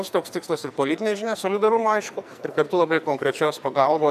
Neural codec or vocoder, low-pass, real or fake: codec, 44.1 kHz, 2.6 kbps, SNAC; 14.4 kHz; fake